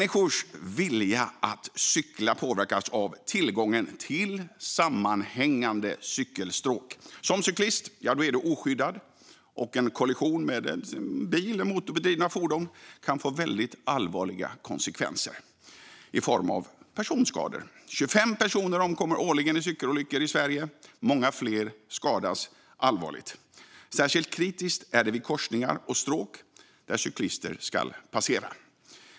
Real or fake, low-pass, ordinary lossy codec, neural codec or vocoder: real; none; none; none